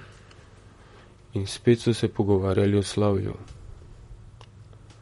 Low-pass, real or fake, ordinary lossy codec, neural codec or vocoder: 19.8 kHz; fake; MP3, 48 kbps; vocoder, 44.1 kHz, 128 mel bands, Pupu-Vocoder